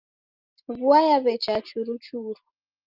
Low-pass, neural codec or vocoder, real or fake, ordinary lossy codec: 5.4 kHz; none; real; Opus, 32 kbps